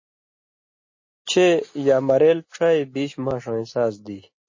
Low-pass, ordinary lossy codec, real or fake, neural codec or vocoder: 7.2 kHz; MP3, 32 kbps; real; none